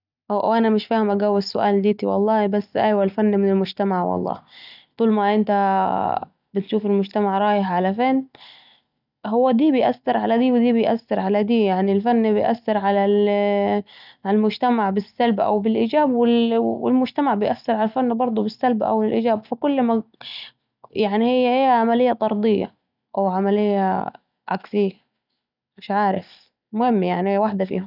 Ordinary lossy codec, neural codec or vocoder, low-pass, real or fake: none; none; 5.4 kHz; real